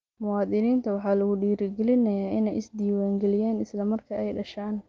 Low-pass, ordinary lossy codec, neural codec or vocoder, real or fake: 7.2 kHz; Opus, 32 kbps; none; real